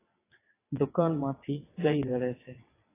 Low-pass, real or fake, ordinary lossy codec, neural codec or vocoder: 3.6 kHz; fake; AAC, 16 kbps; vocoder, 22.05 kHz, 80 mel bands, WaveNeXt